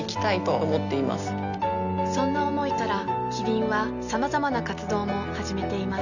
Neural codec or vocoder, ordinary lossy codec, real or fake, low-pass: none; none; real; 7.2 kHz